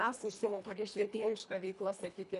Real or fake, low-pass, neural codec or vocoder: fake; 10.8 kHz; codec, 24 kHz, 1.5 kbps, HILCodec